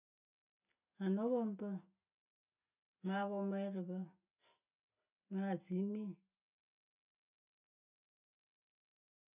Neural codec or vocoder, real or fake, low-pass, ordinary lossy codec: none; real; 3.6 kHz; none